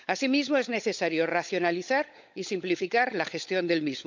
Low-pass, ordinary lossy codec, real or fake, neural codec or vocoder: 7.2 kHz; MP3, 64 kbps; fake; codec, 16 kHz, 16 kbps, FunCodec, trained on LibriTTS, 50 frames a second